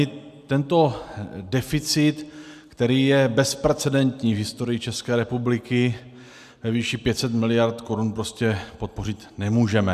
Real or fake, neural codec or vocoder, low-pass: real; none; 14.4 kHz